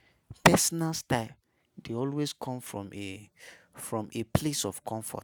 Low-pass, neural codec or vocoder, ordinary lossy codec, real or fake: none; none; none; real